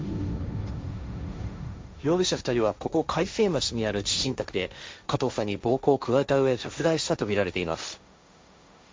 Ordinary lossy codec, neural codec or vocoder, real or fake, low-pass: none; codec, 16 kHz, 1.1 kbps, Voila-Tokenizer; fake; none